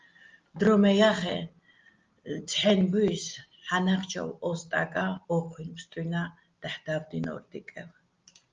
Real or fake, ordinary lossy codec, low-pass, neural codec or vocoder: real; Opus, 32 kbps; 7.2 kHz; none